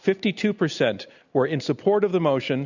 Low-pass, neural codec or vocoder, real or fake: 7.2 kHz; none; real